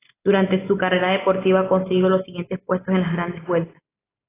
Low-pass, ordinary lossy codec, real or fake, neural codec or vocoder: 3.6 kHz; AAC, 16 kbps; real; none